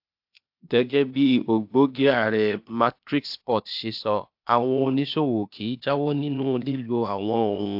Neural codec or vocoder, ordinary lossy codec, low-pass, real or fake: codec, 16 kHz, 0.8 kbps, ZipCodec; AAC, 48 kbps; 5.4 kHz; fake